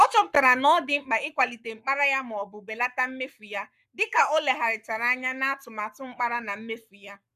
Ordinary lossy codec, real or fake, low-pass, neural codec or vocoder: none; fake; 14.4 kHz; codec, 44.1 kHz, 7.8 kbps, Pupu-Codec